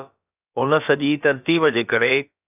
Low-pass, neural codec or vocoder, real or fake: 3.6 kHz; codec, 16 kHz, about 1 kbps, DyCAST, with the encoder's durations; fake